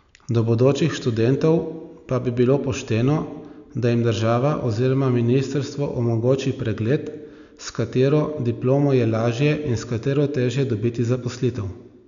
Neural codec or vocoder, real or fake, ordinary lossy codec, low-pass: none; real; none; 7.2 kHz